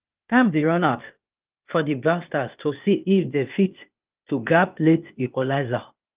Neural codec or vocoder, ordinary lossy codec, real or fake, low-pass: codec, 16 kHz, 0.8 kbps, ZipCodec; Opus, 24 kbps; fake; 3.6 kHz